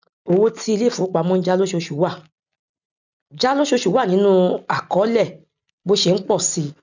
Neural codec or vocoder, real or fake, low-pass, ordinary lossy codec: none; real; 7.2 kHz; none